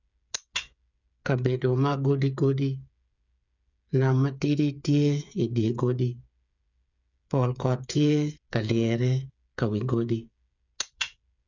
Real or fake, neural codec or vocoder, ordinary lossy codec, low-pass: fake; codec, 16 kHz, 8 kbps, FreqCodec, smaller model; none; 7.2 kHz